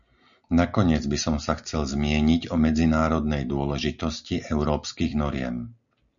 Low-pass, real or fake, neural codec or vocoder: 7.2 kHz; real; none